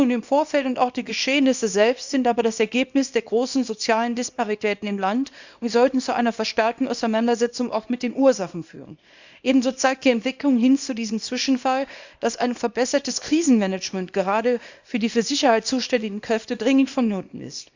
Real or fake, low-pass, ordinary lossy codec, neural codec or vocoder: fake; 7.2 kHz; Opus, 64 kbps; codec, 24 kHz, 0.9 kbps, WavTokenizer, small release